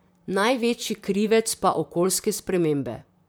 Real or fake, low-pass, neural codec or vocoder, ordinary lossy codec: fake; none; vocoder, 44.1 kHz, 128 mel bands, Pupu-Vocoder; none